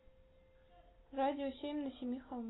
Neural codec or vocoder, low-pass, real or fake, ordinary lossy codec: none; 7.2 kHz; real; AAC, 16 kbps